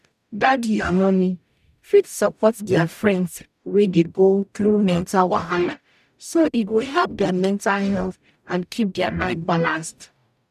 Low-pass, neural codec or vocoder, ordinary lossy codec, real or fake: 14.4 kHz; codec, 44.1 kHz, 0.9 kbps, DAC; none; fake